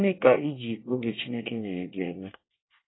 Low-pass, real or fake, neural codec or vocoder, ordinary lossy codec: 7.2 kHz; fake; codec, 24 kHz, 1 kbps, SNAC; AAC, 16 kbps